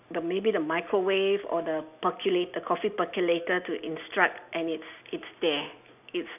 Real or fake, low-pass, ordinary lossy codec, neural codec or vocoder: real; 3.6 kHz; none; none